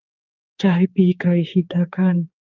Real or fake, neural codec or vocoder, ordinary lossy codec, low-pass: fake; codec, 16 kHz, 4 kbps, X-Codec, HuBERT features, trained on general audio; Opus, 24 kbps; 7.2 kHz